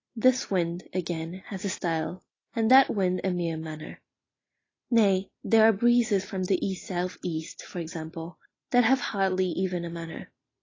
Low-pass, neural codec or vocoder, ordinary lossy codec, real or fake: 7.2 kHz; none; AAC, 32 kbps; real